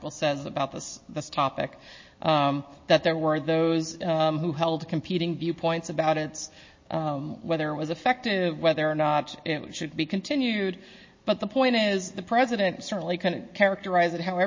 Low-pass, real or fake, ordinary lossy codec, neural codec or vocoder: 7.2 kHz; real; MP3, 32 kbps; none